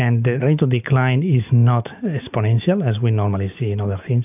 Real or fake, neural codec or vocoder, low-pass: real; none; 3.6 kHz